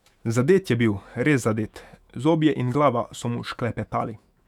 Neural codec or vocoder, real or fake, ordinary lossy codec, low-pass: autoencoder, 48 kHz, 128 numbers a frame, DAC-VAE, trained on Japanese speech; fake; none; 19.8 kHz